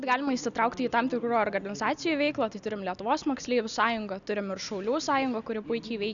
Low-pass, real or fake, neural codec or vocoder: 7.2 kHz; real; none